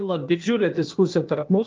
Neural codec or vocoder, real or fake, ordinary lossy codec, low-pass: codec, 16 kHz, 0.8 kbps, ZipCodec; fake; Opus, 32 kbps; 7.2 kHz